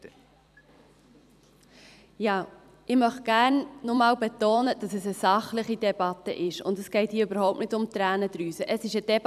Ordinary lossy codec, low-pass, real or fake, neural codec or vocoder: none; 14.4 kHz; fake; vocoder, 44.1 kHz, 128 mel bands every 256 samples, BigVGAN v2